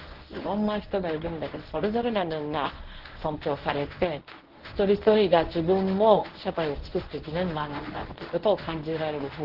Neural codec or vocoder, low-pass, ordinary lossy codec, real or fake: codec, 24 kHz, 0.9 kbps, WavTokenizer, medium speech release version 1; 5.4 kHz; Opus, 16 kbps; fake